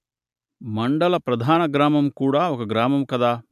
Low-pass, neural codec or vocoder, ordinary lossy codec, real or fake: 14.4 kHz; none; AAC, 96 kbps; real